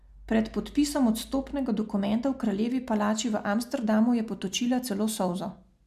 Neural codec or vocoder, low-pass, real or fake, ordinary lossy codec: none; 14.4 kHz; real; MP3, 96 kbps